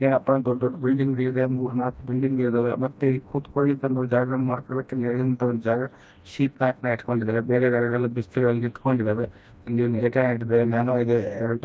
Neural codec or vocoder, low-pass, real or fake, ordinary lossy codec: codec, 16 kHz, 1 kbps, FreqCodec, smaller model; none; fake; none